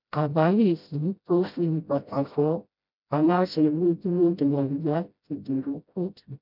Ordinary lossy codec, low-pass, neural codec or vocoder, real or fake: none; 5.4 kHz; codec, 16 kHz, 0.5 kbps, FreqCodec, smaller model; fake